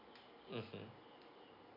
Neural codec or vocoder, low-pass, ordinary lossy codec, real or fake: none; 5.4 kHz; MP3, 48 kbps; real